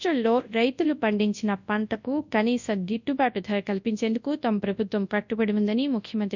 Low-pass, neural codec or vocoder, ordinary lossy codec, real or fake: 7.2 kHz; codec, 24 kHz, 0.9 kbps, WavTokenizer, large speech release; none; fake